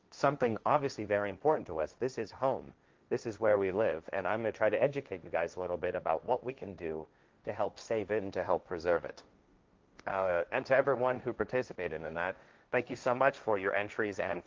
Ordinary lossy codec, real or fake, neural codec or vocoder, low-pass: Opus, 32 kbps; fake; codec, 16 kHz, 1.1 kbps, Voila-Tokenizer; 7.2 kHz